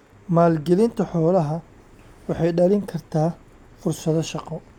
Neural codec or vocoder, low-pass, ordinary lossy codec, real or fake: none; 19.8 kHz; none; real